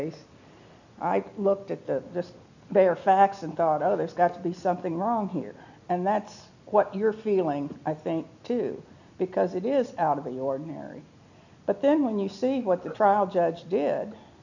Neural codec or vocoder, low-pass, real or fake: none; 7.2 kHz; real